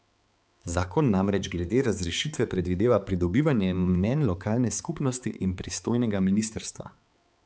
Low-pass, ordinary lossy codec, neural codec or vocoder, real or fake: none; none; codec, 16 kHz, 4 kbps, X-Codec, HuBERT features, trained on balanced general audio; fake